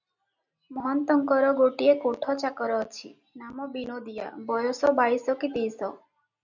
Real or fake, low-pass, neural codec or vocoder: real; 7.2 kHz; none